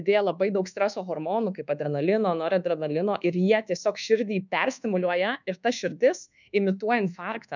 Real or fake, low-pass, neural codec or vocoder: fake; 7.2 kHz; codec, 24 kHz, 1.2 kbps, DualCodec